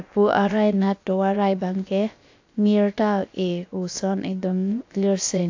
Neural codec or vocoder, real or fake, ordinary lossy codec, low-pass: codec, 16 kHz, about 1 kbps, DyCAST, with the encoder's durations; fake; AAC, 48 kbps; 7.2 kHz